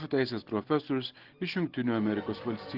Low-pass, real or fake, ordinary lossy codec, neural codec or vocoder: 5.4 kHz; real; Opus, 16 kbps; none